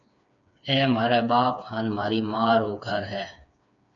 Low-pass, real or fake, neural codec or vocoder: 7.2 kHz; fake; codec, 16 kHz, 4 kbps, FreqCodec, smaller model